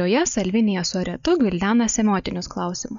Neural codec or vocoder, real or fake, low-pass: none; real; 7.2 kHz